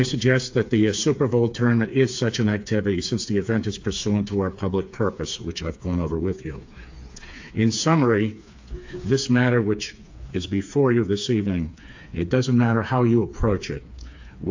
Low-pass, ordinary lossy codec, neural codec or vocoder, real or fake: 7.2 kHz; AAC, 48 kbps; codec, 16 kHz, 4 kbps, FreqCodec, smaller model; fake